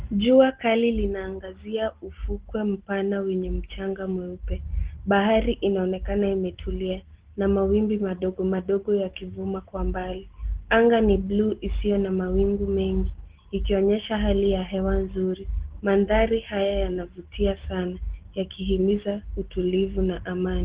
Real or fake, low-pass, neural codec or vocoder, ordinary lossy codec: real; 3.6 kHz; none; Opus, 16 kbps